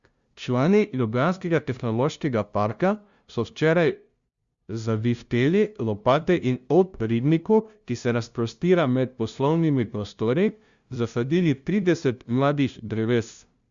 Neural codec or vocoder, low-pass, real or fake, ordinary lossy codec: codec, 16 kHz, 0.5 kbps, FunCodec, trained on LibriTTS, 25 frames a second; 7.2 kHz; fake; Opus, 64 kbps